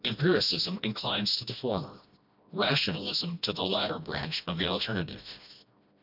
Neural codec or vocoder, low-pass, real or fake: codec, 16 kHz, 1 kbps, FreqCodec, smaller model; 5.4 kHz; fake